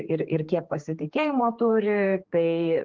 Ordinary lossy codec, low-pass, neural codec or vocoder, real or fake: Opus, 16 kbps; 7.2 kHz; codec, 16 kHz, 4 kbps, X-Codec, HuBERT features, trained on general audio; fake